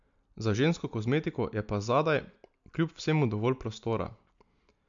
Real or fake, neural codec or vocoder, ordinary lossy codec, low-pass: real; none; MP3, 64 kbps; 7.2 kHz